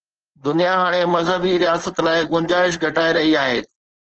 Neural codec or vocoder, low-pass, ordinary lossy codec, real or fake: codec, 16 kHz, 4.8 kbps, FACodec; 7.2 kHz; Opus, 16 kbps; fake